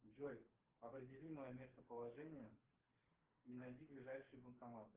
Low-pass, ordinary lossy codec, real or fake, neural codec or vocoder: 3.6 kHz; Opus, 16 kbps; fake; codec, 16 kHz, 4 kbps, FreqCodec, smaller model